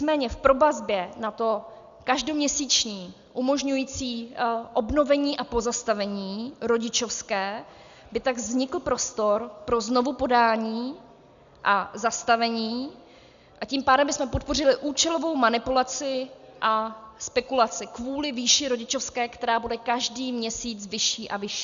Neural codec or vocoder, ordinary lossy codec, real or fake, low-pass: none; Opus, 64 kbps; real; 7.2 kHz